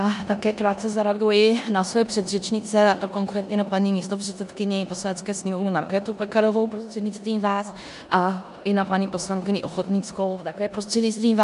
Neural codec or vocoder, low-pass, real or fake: codec, 16 kHz in and 24 kHz out, 0.9 kbps, LongCat-Audio-Codec, four codebook decoder; 10.8 kHz; fake